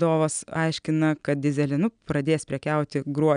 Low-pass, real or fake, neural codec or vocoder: 9.9 kHz; real; none